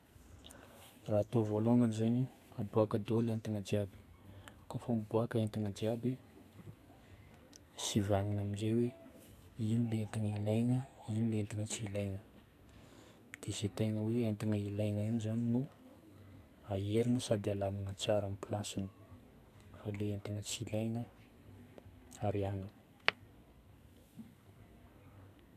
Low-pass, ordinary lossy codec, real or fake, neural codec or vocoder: 14.4 kHz; none; fake; codec, 44.1 kHz, 2.6 kbps, SNAC